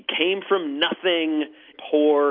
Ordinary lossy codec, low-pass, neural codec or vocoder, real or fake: MP3, 48 kbps; 5.4 kHz; none; real